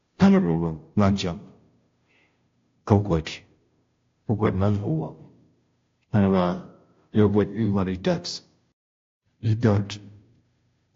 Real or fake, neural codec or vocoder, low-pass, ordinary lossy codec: fake; codec, 16 kHz, 0.5 kbps, FunCodec, trained on Chinese and English, 25 frames a second; 7.2 kHz; AAC, 48 kbps